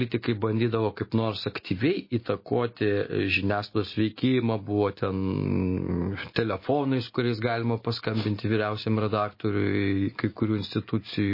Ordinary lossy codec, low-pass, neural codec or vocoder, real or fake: MP3, 24 kbps; 5.4 kHz; none; real